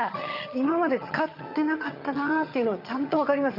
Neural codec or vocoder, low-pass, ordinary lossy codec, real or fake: vocoder, 22.05 kHz, 80 mel bands, HiFi-GAN; 5.4 kHz; none; fake